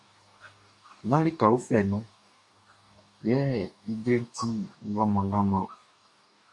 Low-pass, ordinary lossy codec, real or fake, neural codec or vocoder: 10.8 kHz; MP3, 64 kbps; fake; codec, 44.1 kHz, 2.6 kbps, DAC